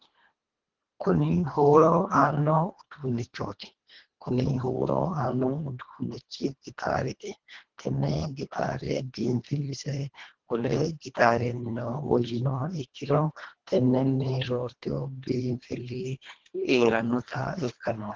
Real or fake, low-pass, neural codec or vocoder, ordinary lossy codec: fake; 7.2 kHz; codec, 24 kHz, 1.5 kbps, HILCodec; Opus, 16 kbps